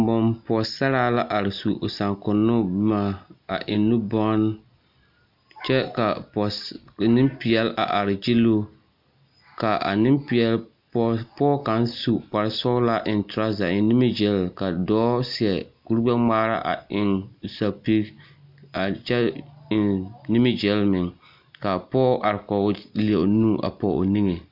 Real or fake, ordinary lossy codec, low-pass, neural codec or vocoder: real; MP3, 48 kbps; 5.4 kHz; none